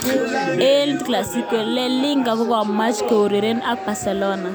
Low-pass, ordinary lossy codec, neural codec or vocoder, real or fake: none; none; none; real